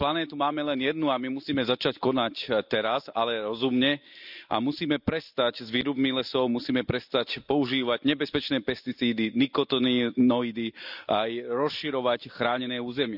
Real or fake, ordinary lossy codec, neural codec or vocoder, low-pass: real; none; none; 5.4 kHz